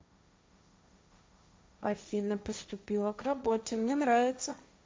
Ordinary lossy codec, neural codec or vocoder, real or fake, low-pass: none; codec, 16 kHz, 1.1 kbps, Voila-Tokenizer; fake; none